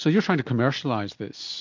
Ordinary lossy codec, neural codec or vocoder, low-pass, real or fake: MP3, 48 kbps; none; 7.2 kHz; real